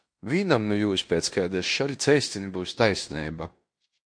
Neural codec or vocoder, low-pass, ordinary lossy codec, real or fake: codec, 16 kHz in and 24 kHz out, 0.9 kbps, LongCat-Audio-Codec, fine tuned four codebook decoder; 9.9 kHz; MP3, 48 kbps; fake